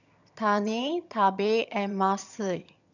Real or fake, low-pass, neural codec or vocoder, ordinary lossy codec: fake; 7.2 kHz; vocoder, 22.05 kHz, 80 mel bands, HiFi-GAN; none